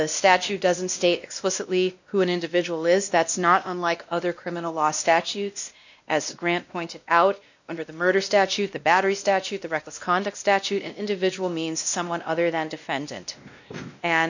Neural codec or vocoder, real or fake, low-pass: codec, 16 kHz, 1 kbps, X-Codec, WavLM features, trained on Multilingual LibriSpeech; fake; 7.2 kHz